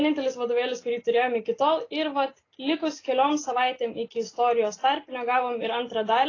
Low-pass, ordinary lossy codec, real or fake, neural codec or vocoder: 7.2 kHz; AAC, 32 kbps; real; none